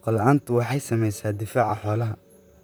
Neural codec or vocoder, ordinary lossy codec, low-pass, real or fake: vocoder, 44.1 kHz, 128 mel bands, Pupu-Vocoder; none; none; fake